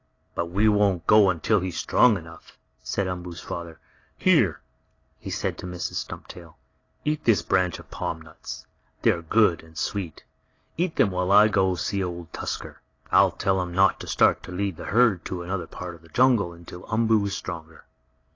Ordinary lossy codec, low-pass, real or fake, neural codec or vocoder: AAC, 32 kbps; 7.2 kHz; real; none